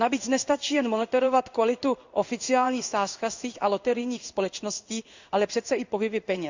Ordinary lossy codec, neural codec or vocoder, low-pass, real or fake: Opus, 64 kbps; codec, 16 kHz in and 24 kHz out, 1 kbps, XY-Tokenizer; 7.2 kHz; fake